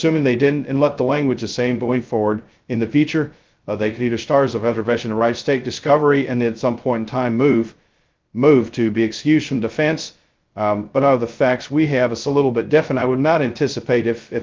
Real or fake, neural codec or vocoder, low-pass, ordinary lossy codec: fake; codec, 16 kHz, 0.2 kbps, FocalCodec; 7.2 kHz; Opus, 24 kbps